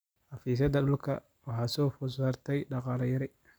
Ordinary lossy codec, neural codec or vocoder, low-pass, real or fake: none; none; none; real